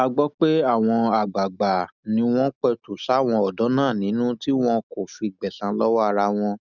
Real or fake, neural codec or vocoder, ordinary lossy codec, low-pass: real; none; none; 7.2 kHz